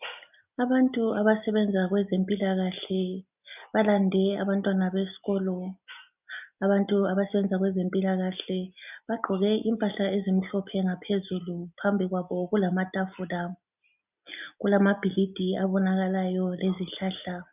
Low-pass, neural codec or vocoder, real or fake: 3.6 kHz; none; real